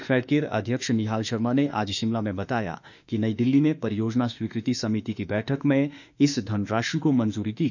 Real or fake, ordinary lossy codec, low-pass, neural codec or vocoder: fake; none; 7.2 kHz; autoencoder, 48 kHz, 32 numbers a frame, DAC-VAE, trained on Japanese speech